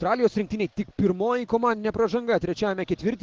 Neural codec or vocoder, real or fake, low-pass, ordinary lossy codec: none; real; 7.2 kHz; Opus, 16 kbps